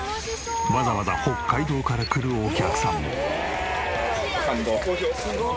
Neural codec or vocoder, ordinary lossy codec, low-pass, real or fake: none; none; none; real